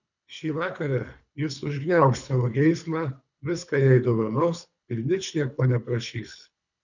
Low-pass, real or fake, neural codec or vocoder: 7.2 kHz; fake; codec, 24 kHz, 3 kbps, HILCodec